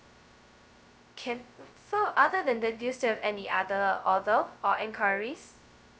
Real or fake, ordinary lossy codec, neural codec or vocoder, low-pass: fake; none; codec, 16 kHz, 0.2 kbps, FocalCodec; none